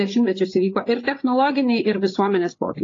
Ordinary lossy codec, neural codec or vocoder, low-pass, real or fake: AAC, 32 kbps; codec, 16 kHz, 8 kbps, FreqCodec, larger model; 7.2 kHz; fake